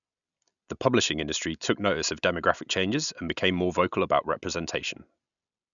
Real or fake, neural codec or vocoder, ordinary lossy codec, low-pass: real; none; none; 7.2 kHz